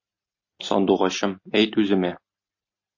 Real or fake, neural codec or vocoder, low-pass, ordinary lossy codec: real; none; 7.2 kHz; MP3, 32 kbps